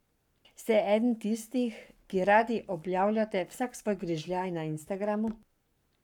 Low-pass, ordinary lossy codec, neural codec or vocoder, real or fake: 19.8 kHz; none; codec, 44.1 kHz, 7.8 kbps, Pupu-Codec; fake